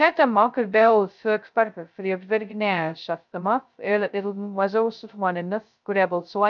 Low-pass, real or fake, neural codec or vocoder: 7.2 kHz; fake; codec, 16 kHz, 0.2 kbps, FocalCodec